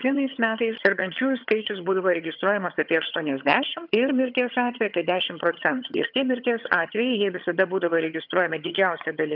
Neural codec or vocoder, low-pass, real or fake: vocoder, 22.05 kHz, 80 mel bands, HiFi-GAN; 5.4 kHz; fake